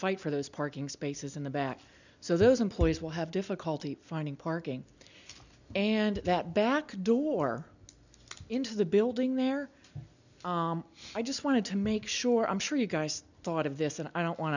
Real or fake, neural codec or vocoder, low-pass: real; none; 7.2 kHz